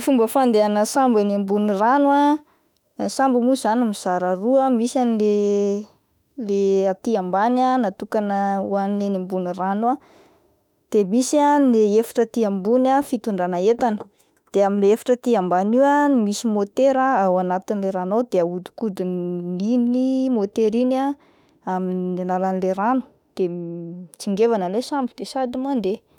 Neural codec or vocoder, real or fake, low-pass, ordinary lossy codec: autoencoder, 48 kHz, 32 numbers a frame, DAC-VAE, trained on Japanese speech; fake; 19.8 kHz; none